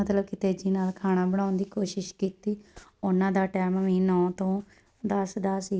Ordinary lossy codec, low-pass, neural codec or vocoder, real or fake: none; none; none; real